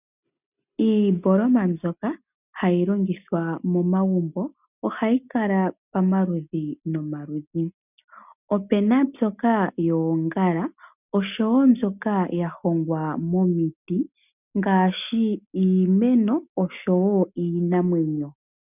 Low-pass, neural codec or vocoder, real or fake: 3.6 kHz; none; real